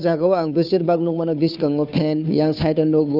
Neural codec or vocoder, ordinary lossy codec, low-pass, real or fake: codec, 16 kHz, 2 kbps, FunCodec, trained on Chinese and English, 25 frames a second; none; 5.4 kHz; fake